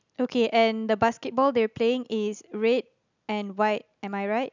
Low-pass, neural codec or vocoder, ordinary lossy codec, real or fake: 7.2 kHz; none; none; real